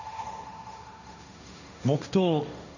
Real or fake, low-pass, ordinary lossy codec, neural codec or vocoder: fake; 7.2 kHz; none; codec, 16 kHz, 1.1 kbps, Voila-Tokenizer